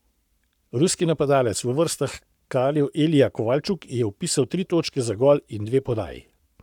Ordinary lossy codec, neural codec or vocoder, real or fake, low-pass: none; codec, 44.1 kHz, 7.8 kbps, Pupu-Codec; fake; 19.8 kHz